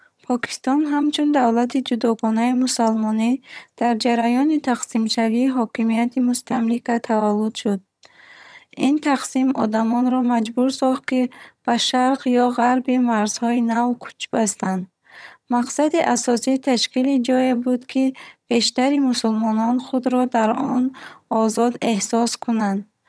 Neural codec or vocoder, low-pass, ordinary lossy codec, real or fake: vocoder, 22.05 kHz, 80 mel bands, HiFi-GAN; none; none; fake